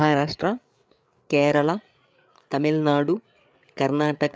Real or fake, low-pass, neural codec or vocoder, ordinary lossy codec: fake; none; codec, 16 kHz, 8 kbps, FreqCodec, larger model; none